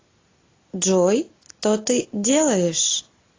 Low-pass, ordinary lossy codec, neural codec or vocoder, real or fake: 7.2 kHz; AAC, 32 kbps; none; real